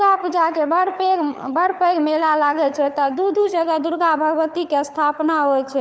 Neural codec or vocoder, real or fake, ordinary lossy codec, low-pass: codec, 16 kHz, 4 kbps, FunCodec, trained on LibriTTS, 50 frames a second; fake; none; none